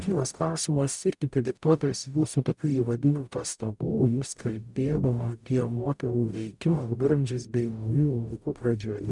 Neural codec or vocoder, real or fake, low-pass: codec, 44.1 kHz, 0.9 kbps, DAC; fake; 10.8 kHz